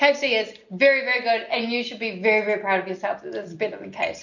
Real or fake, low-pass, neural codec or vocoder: real; 7.2 kHz; none